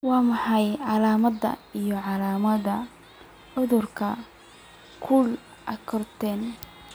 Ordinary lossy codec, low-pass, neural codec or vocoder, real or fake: none; none; none; real